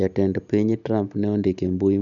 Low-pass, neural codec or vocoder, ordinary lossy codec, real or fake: 7.2 kHz; none; none; real